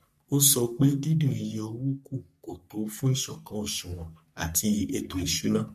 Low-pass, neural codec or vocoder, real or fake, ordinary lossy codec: 14.4 kHz; codec, 44.1 kHz, 3.4 kbps, Pupu-Codec; fake; MP3, 64 kbps